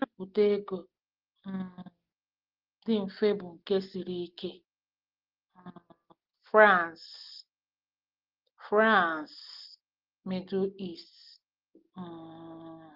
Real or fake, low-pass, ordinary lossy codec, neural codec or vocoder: real; 5.4 kHz; Opus, 16 kbps; none